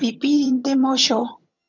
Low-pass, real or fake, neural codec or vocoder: 7.2 kHz; fake; vocoder, 22.05 kHz, 80 mel bands, HiFi-GAN